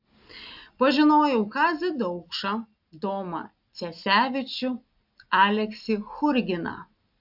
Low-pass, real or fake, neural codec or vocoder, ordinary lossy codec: 5.4 kHz; real; none; AAC, 48 kbps